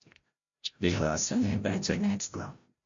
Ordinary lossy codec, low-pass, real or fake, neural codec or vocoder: MP3, 48 kbps; 7.2 kHz; fake; codec, 16 kHz, 0.5 kbps, FreqCodec, larger model